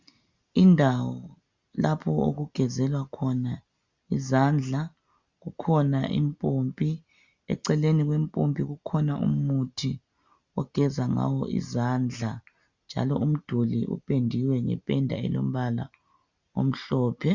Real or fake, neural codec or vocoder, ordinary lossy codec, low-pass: real; none; Opus, 64 kbps; 7.2 kHz